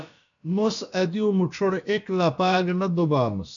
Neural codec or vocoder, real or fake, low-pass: codec, 16 kHz, about 1 kbps, DyCAST, with the encoder's durations; fake; 7.2 kHz